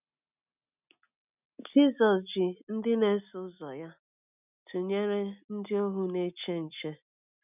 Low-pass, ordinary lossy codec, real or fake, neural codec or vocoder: 3.6 kHz; none; real; none